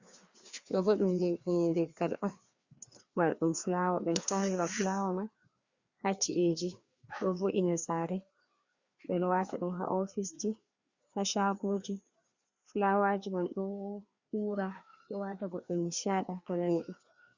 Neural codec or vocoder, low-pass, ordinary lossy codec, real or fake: codec, 16 kHz, 2 kbps, FreqCodec, larger model; 7.2 kHz; Opus, 64 kbps; fake